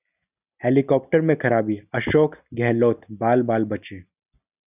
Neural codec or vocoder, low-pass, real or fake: none; 3.6 kHz; real